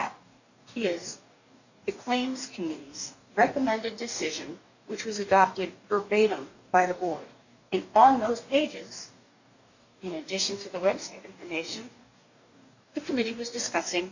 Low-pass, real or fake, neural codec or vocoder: 7.2 kHz; fake; codec, 44.1 kHz, 2.6 kbps, DAC